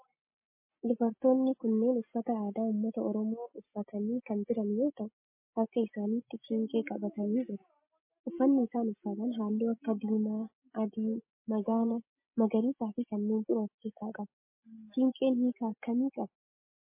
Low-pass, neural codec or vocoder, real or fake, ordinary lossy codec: 3.6 kHz; none; real; MP3, 24 kbps